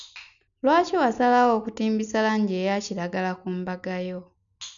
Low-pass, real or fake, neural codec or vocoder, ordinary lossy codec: 7.2 kHz; real; none; none